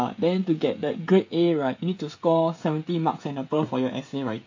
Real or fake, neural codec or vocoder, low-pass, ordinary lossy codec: fake; codec, 16 kHz, 16 kbps, FreqCodec, smaller model; 7.2 kHz; AAC, 48 kbps